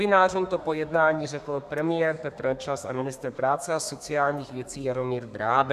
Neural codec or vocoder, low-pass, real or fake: codec, 32 kHz, 1.9 kbps, SNAC; 14.4 kHz; fake